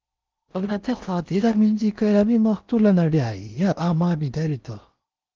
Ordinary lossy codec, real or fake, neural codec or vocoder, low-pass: Opus, 32 kbps; fake; codec, 16 kHz in and 24 kHz out, 0.6 kbps, FocalCodec, streaming, 4096 codes; 7.2 kHz